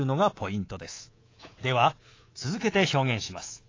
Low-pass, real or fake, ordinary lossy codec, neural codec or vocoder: 7.2 kHz; fake; AAC, 32 kbps; codec, 24 kHz, 3.1 kbps, DualCodec